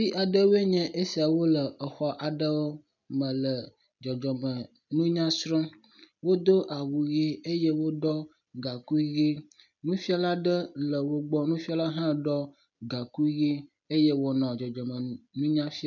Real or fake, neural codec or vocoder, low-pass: real; none; 7.2 kHz